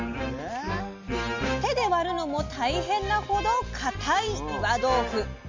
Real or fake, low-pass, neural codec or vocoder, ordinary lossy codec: real; 7.2 kHz; none; MP3, 48 kbps